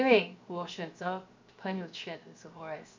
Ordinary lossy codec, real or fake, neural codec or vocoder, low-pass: MP3, 64 kbps; fake; codec, 16 kHz, 0.7 kbps, FocalCodec; 7.2 kHz